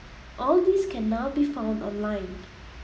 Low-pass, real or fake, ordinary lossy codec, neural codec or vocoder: none; real; none; none